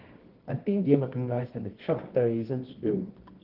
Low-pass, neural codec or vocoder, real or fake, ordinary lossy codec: 5.4 kHz; codec, 24 kHz, 0.9 kbps, WavTokenizer, medium music audio release; fake; Opus, 16 kbps